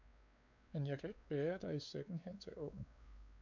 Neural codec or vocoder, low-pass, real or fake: codec, 16 kHz, 4 kbps, X-Codec, WavLM features, trained on Multilingual LibriSpeech; 7.2 kHz; fake